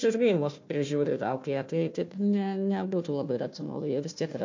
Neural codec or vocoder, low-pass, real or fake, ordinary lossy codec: codec, 16 kHz, 1 kbps, FunCodec, trained on Chinese and English, 50 frames a second; 7.2 kHz; fake; MP3, 48 kbps